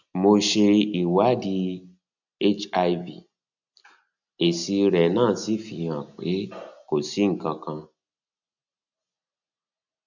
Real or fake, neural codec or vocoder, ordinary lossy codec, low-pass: real; none; none; 7.2 kHz